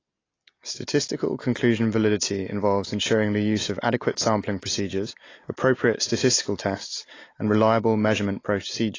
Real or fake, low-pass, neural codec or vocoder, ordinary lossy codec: real; 7.2 kHz; none; AAC, 32 kbps